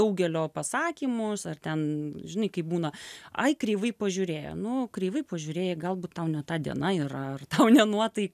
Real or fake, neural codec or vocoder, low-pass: real; none; 14.4 kHz